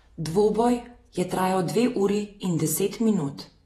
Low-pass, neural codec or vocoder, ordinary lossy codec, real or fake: 19.8 kHz; vocoder, 48 kHz, 128 mel bands, Vocos; AAC, 32 kbps; fake